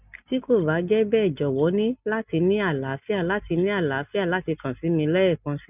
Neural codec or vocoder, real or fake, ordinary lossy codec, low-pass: none; real; none; 3.6 kHz